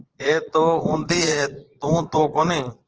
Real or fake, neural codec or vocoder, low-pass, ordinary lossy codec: fake; vocoder, 22.05 kHz, 80 mel bands, Vocos; 7.2 kHz; Opus, 16 kbps